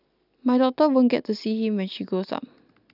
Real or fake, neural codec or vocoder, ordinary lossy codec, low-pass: real; none; none; 5.4 kHz